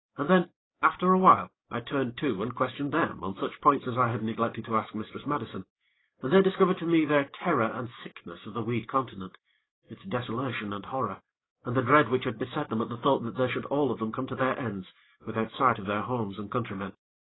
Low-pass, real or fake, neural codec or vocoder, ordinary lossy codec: 7.2 kHz; fake; codec, 44.1 kHz, 7.8 kbps, DAC; AAC, 16 kbps